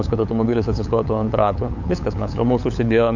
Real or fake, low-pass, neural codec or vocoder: fake; 7.2 kHz; codec, 16 kHz, 8 kbps, FunCodec, trained on LibriTTS, 25 frames a second